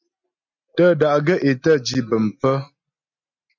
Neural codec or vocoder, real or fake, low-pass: none; real; 7.2 kHz